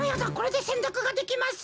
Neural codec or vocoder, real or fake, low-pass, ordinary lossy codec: none; real; none; none